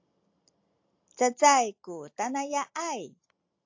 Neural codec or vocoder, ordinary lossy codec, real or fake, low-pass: none; AAC, 48 kbps; real; 7.2 kHz